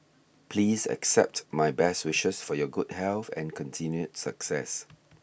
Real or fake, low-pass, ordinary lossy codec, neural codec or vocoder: real; none; none; none